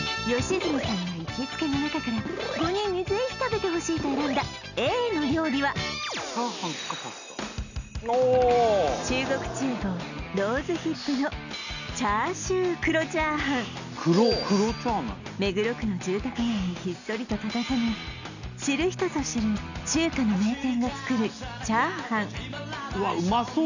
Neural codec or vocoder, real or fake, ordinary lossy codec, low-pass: none; real; none; 7.2 kHz